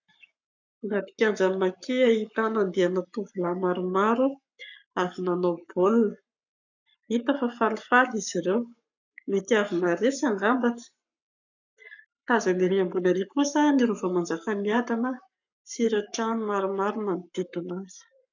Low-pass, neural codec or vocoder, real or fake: 7.2 kHz; codec, 44.1 kHz, 7.8 kbps, Pupu-Codec; fake